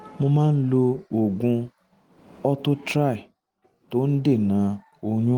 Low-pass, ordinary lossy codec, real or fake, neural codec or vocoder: 19.8 kHz; Opus, 24 kbps; real; none